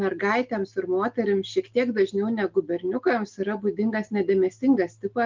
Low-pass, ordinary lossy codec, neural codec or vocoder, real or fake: 7.2 kHz; Opus, 32 kbps; none; real